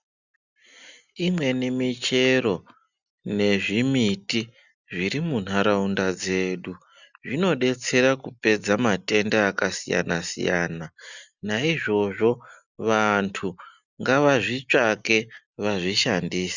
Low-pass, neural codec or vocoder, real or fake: 7.2 kHz; none; real